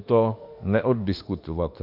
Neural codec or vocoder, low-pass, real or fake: autoencoder, 48 kHz, 32 numbers a frame, DAC-VAE, trained on Japanese speech; 5.4 kHz; fake